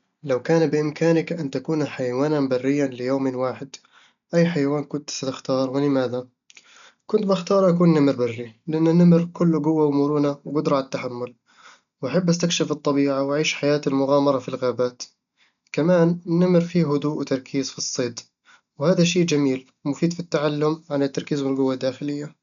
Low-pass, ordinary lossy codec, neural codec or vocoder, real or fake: 7.2 kHz; none; none; real